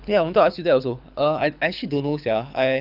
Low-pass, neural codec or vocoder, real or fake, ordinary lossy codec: 5.4 kHz; codec, 24 kHz, 6 kbps, HILCodec; fake; none